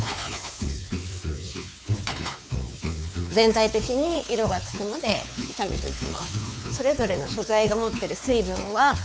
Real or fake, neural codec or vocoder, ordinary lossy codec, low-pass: fake; codec, 16 kHz, 4 kbps, X-Codec, WavLM features, trained on Multilingual LibriSpeech; none; none